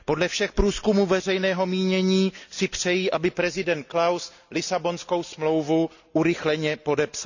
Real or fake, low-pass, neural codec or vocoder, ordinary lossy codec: real; 7.2 kHz; none; none